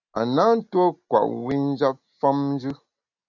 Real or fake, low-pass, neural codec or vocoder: real; 7.2 kHz; none